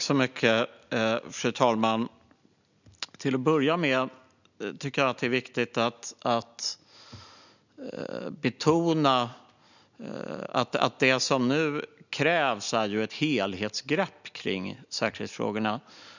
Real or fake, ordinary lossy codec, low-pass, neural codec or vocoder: real; none; 7.2 kHz; none